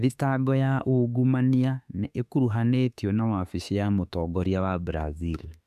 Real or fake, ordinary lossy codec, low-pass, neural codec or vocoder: fake; none; 14.4 kHz; autoencoder, 48 kHz, 32 numbers a frame, DAC-VAE, trained on Japanese speech